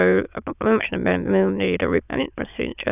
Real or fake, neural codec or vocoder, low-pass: fake; autoencoder, 22.05 kHz, a latent of 192 numbers a frame, VITS, trained on many speakers; 3.6 kHz